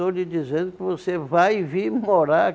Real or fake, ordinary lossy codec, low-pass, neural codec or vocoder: real; none; none; none